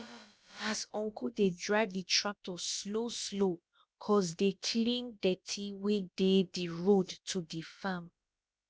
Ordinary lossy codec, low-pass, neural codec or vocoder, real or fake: none; none; codec, 16 kHz, about 1 kbps, DyCAST, with the encoder's durations; fake